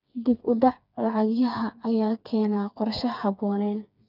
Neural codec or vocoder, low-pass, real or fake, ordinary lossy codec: codec, 16 kHz, 4 kbps, FreqCodec, smaller model; 5.4 kHz; fake; none